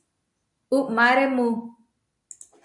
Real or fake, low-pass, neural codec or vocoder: real; 10.8 kHz; none